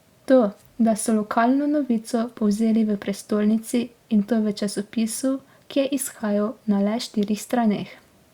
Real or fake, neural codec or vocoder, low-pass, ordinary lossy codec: real; none; 19.8 kHz; Opus, 64 kbps